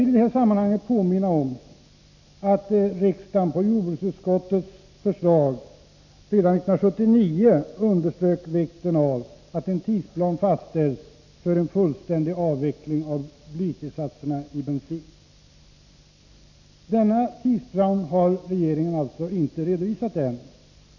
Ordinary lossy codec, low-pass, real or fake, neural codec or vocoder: none; 7.2 kHz; real; none